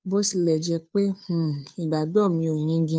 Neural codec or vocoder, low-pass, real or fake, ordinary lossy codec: codec, 16 kHz, 2 kbps, FunCodec, trained on Chinese and English, 25 frames a second; none; fake; none